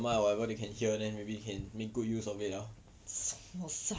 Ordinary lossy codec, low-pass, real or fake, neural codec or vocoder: none; none; real; none